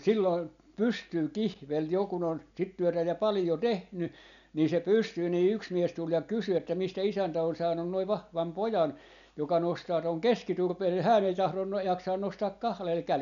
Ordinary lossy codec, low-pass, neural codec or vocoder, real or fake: none; 7.2 kHz; none; real